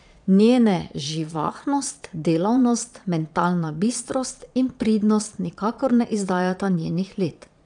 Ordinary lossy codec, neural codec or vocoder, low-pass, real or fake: none; vocoder, 22.05 kHz, 80 mel bands, Vocos; 9.9 kHz; fake